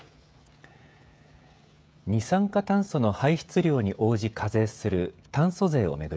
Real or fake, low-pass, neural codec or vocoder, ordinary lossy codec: fake; none; codec, 16 kHz, 16 kbps, FreqCodec, smaller model; none